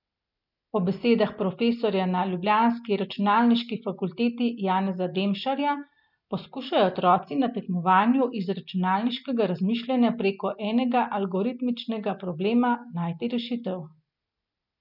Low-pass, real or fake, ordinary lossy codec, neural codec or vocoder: 5.4 kHz; real; none; none